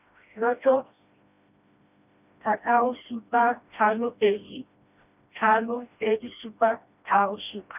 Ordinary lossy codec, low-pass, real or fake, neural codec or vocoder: none; 3.6 kHz; fake; codec, 16 kHz, 1 kbps, FreqCodec, smaller model